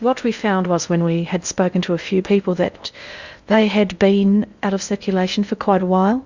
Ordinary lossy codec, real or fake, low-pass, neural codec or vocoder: Opus, 64 kbps; fake; 7.2 kHz; codec, 16 kHz in and 24 kHz out, 0.6 kbps, FocalCodec, streaming, 2048 codes